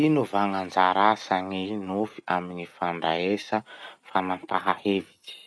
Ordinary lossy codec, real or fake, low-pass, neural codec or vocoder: none; real; none; none